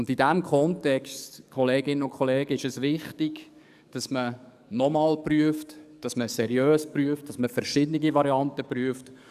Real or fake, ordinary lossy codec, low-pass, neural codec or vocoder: fake; none; 14.4 kHz; codec, 44.1 kHz, 7.8 kbps, DAC